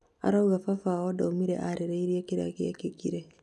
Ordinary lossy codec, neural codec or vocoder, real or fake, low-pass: none; none; real; none